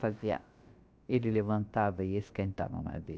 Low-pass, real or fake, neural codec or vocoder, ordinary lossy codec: none; fake; codec, 16 kHz, about 1 kbps, DyCAST, with the encoder's durations; none